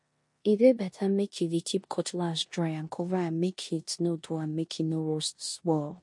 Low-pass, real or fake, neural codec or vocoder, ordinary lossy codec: 10.8 kHz; fake; codec, 16 kHz in and 24 kHz out, 0.9 kbps, LongCat-Audio-Codec, four codebook decoder; MP3, 48 kbps